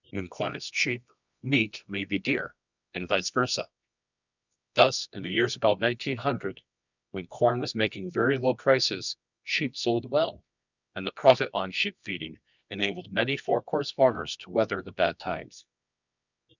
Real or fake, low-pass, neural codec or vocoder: fake; 7.2 kHz; codec, 24 kHz, 0.9 kbps, WavTokenizer, medium music audio release